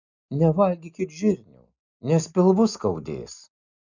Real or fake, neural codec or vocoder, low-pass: real; none; 7.2 kHz